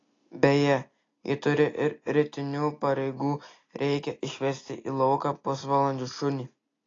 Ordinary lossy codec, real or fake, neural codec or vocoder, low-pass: AAC, 32 kbps; real; none; 7.2 kHz